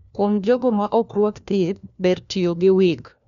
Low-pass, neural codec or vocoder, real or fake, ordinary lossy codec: 7.2 kHz; codec, 16 kHz, 1 kbps, FunCodec, trained on LibriTTS, 50 frames a second; fake; none